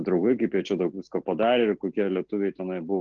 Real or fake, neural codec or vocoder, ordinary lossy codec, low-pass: real; none; Opus, 16 kbps; 7.2 kHz